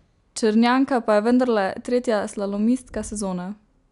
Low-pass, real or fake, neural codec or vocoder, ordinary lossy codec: 10.8 kHz; real; none; none